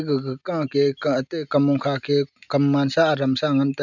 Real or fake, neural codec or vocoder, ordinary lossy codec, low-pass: real; none; none; 7.2 kHz